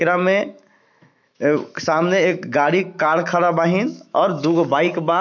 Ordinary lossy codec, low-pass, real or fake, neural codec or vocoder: none; 7.2 kHz; fake; autoencoder, 48 kHz, 128 numbers a frame, DAC-VAE, trained on Japanese speech